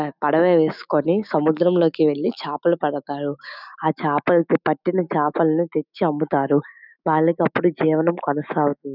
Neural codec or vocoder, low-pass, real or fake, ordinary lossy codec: autoencoder, 48 kHz, 128 numbers a frame, DAC-VAE, trained on Japanese speech; 5.4 kHz; fake; none